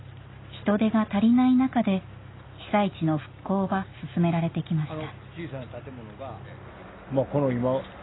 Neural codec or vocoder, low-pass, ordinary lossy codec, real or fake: none; 7.2 kHz; AAC, 16 kbps; real